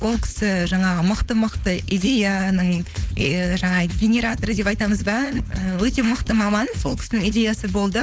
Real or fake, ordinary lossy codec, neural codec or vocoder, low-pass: fake; none; codec, 16 kHz, 4.8 kbps, FACodec; none